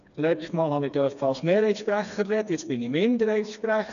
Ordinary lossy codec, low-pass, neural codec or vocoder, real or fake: none; 7.2 kHz; codec, 16 kHz, 2 kbps, FreqCodec, smaller model; fake